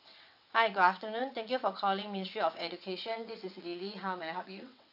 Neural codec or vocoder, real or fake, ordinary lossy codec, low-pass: vocoder, 22.05 kHz, 80 mel bands, WaveNeXt; fake; none; 5.4 kHz